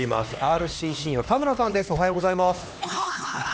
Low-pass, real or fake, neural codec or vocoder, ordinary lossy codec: none; fake; codec, 16 kHz, 2 kbps, X-Codec, HuBERT features, trained on LibriSpeech; none